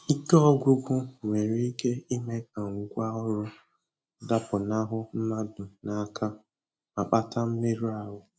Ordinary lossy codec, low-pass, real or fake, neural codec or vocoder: none; none; real; none